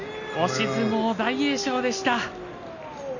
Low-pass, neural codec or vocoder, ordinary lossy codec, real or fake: 7.2 kHz; none; none; real